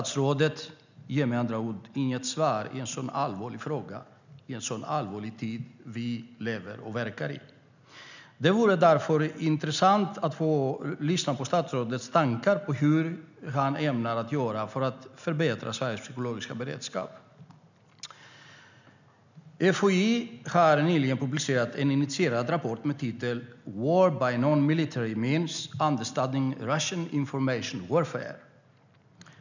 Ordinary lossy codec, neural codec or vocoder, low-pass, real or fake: none; none; 7.2 kHz; real